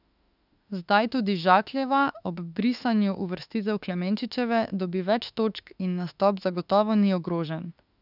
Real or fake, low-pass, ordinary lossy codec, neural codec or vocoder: fake; 5.4 kHz; none; autoencoder, 48 kHz, 32 numbers a frame, DAC-VAE, trained on Japanese speech